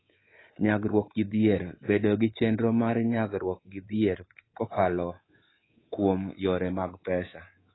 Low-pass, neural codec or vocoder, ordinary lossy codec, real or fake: 7.2 kHz; codec, 24 kHz, 3.1 kbps, DualCodec; AAC, 16 kbps; fake